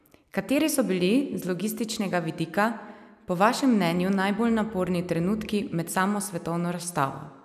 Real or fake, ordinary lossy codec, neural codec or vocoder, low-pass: real; none; none; 14.4 kHz